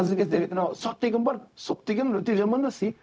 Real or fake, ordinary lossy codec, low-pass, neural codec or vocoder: fake; none; none; codec, 16 kHz, 0.4 kbps, LongCat-Audio-Codec